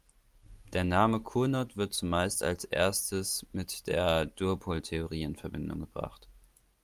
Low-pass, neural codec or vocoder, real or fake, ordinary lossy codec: 14.4 kHz; none; real; Opus, 32 kbps